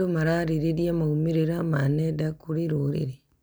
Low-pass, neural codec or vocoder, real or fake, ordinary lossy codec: none; none; real; none